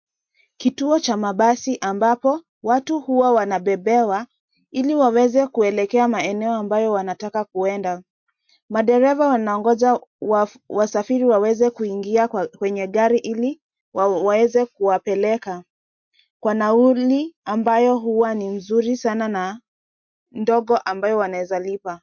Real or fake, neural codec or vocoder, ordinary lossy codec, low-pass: real; none; MP3, 48 kbps; 7.2 kHz